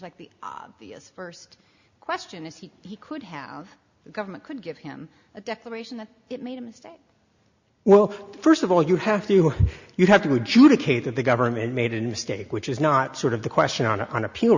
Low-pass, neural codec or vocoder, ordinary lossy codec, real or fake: 7.2 kHz; none; Opus, 64 kbps; real